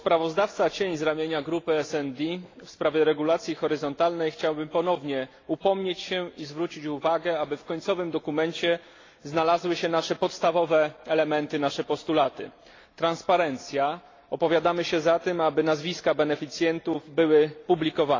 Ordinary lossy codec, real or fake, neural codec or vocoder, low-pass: AAC, 32 kbps; real; none; 7.2 kHz